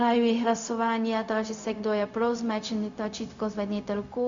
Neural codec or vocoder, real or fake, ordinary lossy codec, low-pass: codec, 16 kHz, 0.4 kbps, LongCat-Audio-Codec; fake; Opus, 64 kbps; 7.2 kHz